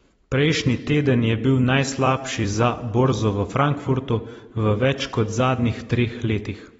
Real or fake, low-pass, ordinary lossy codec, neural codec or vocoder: fake; 19.8 kHz; AAC, 24 kbps; vocoder, 44.1 kHz, 128 mel bands every 256 samples, BigVGAN v2